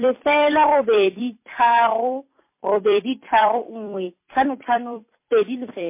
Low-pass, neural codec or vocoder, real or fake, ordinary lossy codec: 3.6 kHz; vocoder, 44.1 kHz, 128 mel bands every 256 samples, BigVGAN v2; fake; MP3, 24 kbps